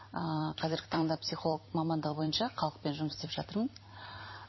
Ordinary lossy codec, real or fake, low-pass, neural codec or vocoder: MP3, 24 kbps; fake; 7.2 kHz; vocoder, 44.1 kHz, 128 mel bands every 256 samples, BigVGAN v2